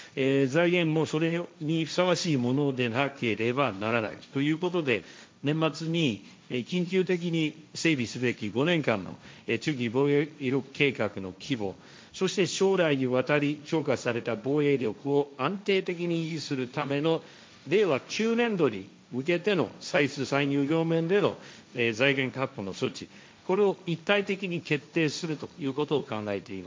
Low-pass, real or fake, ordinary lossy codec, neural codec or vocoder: none; fake; none; codec, 16 kHz, 1.1 kbps, Voila-Tokenizer